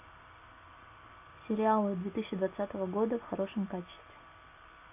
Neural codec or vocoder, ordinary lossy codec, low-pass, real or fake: none; AAC, 32 kbps; 3.6 kHz; real